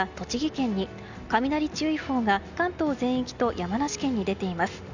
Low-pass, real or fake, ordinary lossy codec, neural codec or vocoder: 7.2 kHz; real; none; none